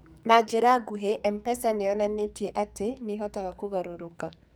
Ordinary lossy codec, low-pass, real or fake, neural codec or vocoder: none; none; fake; codec, 44.1 kHz, 2.6 kbps, SNAC